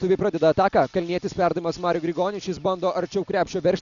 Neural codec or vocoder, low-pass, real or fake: none; 7.2 kHz; real